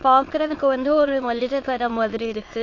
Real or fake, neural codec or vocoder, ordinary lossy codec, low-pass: fake; autoencoder, 22.05 kHz, a latent of 192 numbers a frame, VITS, trained on many speakers; AAC, 32 kbps; 7.2 kHz